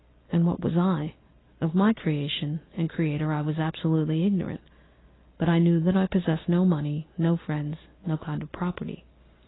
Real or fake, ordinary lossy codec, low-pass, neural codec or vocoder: real; AAC, 16 kbps; 7.2 kHz; none